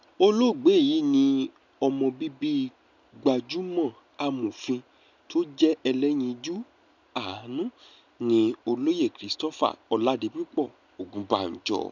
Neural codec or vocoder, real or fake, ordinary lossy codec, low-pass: none; real; none; 7.2 kHz